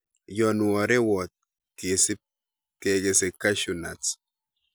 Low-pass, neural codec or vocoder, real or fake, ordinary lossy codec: none; none; real; none